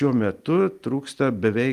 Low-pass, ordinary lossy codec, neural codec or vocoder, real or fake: 14.4 kHz; Opus, 32 kbps; none; real